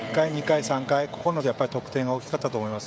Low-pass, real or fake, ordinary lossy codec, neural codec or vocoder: none; fake; none; codec, 16 kHz, 16 kbps, FreqCodec, smaller model